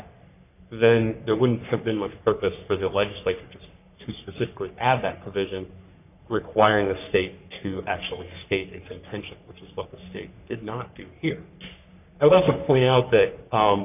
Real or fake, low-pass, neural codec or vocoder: fake; 3.6 kHz; codec, 44.1 kHz, 3.4 kbps, Pupu-Codec